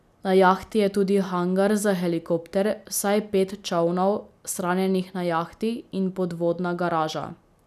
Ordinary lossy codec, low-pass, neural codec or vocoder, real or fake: none; 14.4 kHz; none; real